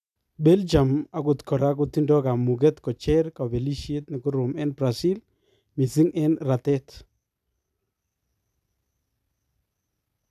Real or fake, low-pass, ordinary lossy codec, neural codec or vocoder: real; 14.4 kHz; none; none